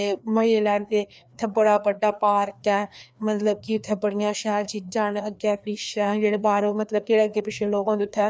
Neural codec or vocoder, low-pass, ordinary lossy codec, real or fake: codec, 16 kHz, 2 kbps, FreqCodec, larger model; none; none; fake